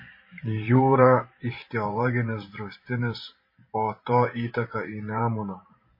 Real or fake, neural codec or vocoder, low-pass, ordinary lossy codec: fake; vocoder, 44.1 kHz, 128 mel bands every 512 samples, BigVGAN v2; 5.4 kHz; MP3, 24 kbps